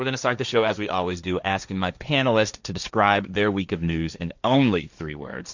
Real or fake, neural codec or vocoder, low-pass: fake; codec, 16 kHz, 1.1 kbps, Voila-Tokenizer; 7.2 kHz